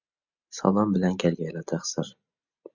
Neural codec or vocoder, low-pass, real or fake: none; 7.2 kHz; real